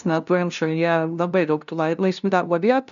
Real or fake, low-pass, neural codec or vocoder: fake; 7.2 kHz; codec, 16 kHz, 0.5 kbps, FunCodec, trained on LibriTTS, 25 frames a second